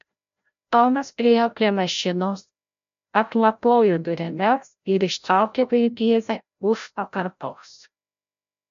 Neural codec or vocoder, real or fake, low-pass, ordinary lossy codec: codec, 16 kHz, 0.5 kbps, FreqCodec, larger model; fake; 7.2 kHz; MP3, 64 kbps